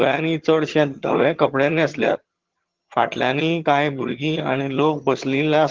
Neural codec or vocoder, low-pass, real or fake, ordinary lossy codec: vocoder, 22.05 kHz, 80 mel bands, HiFi-GAN; 7.2 kHz; fake; Opus, 16 kbps